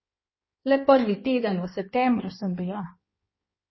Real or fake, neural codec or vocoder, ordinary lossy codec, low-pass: fake; codec, 16 kHz in and 24 kHz out, 1.1 kbps, FireRedTTS-2 codec; MP3, 24 kbps; 7.2 kHz